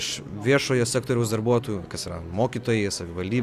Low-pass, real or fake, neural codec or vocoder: 14.4 kHz; real; none